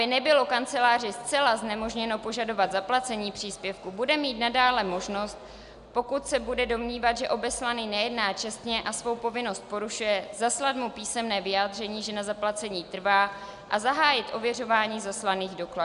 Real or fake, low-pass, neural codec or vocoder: real; 10.8 kHz; none